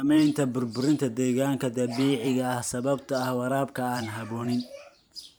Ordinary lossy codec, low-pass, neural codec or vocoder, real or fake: none; none; vocoder, 44.1 kHz, 128 mel bands every 512 samples, BigVGAN v2; fake